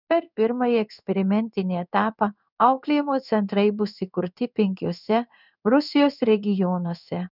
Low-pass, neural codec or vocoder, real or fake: 5.4 kHz; codec, 16 kHz in and 24 kHz out, 1 kbps, XY-Tokenizer; fake